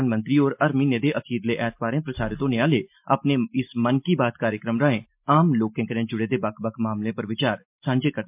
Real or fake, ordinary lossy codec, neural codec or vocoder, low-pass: real; MP3, 32 kbps; none; 3.6 kHz